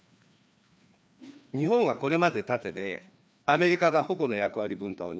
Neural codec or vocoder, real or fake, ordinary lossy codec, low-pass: codec, 16 kHz, 2 kbps, FreqCodec, larger model; fake; none; none